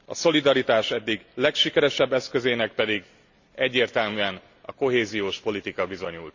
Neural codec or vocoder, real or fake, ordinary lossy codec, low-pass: none; real; Opus, 64 kbps; 7.2 kHz